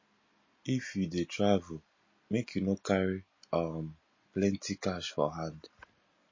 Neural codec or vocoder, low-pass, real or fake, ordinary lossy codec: none; 7.2 kHz; real; MP3, 32 kbps